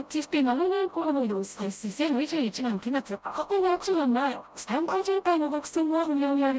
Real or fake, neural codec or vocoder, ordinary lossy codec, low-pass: fake; codec, 16 kHz, 0.5 kbps, FreqCodec, smaller model; none; none